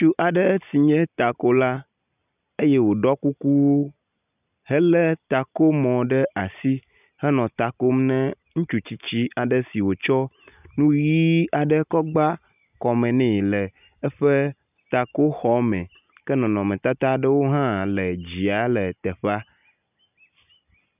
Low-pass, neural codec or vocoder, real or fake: 3.6 kHz; none; real